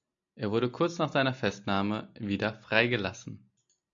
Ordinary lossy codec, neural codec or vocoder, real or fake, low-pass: AAC, 64 kbps; none; real; 7.2 kHz